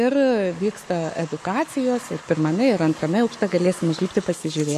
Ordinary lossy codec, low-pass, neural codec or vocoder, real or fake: MP3, 96 kbps; 14.4 kHz; codec, 44.1 kHz, 7.8 kbps, Pupu-Codec; fake